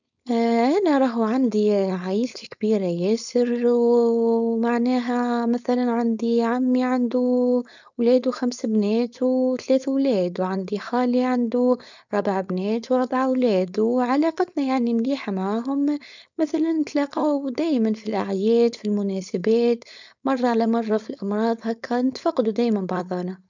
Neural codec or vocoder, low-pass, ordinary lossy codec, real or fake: codec, 16 kHz, 4.8 kbps, FACodec; 7.2 kHz; none; fake